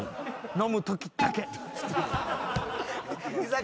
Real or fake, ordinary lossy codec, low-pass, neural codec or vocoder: real; none; none; none